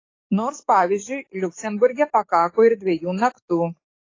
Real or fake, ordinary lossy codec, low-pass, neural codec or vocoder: fake; AAC, 32 kbps; 7.2 kHz; codec, 44.1 kHz, 7.8 kbps, DAC